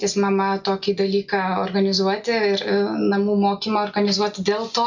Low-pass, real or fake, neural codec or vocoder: 7.2 kHz; real; none